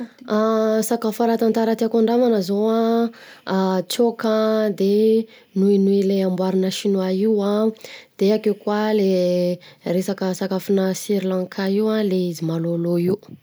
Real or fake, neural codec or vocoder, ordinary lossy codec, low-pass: real; none; none; none